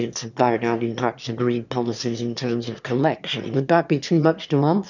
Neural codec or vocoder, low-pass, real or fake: autoencoder, 22.05 kHz, a latent of 192 numbers a frame, VITS, trained on one speaker; 7.2 kHz; fake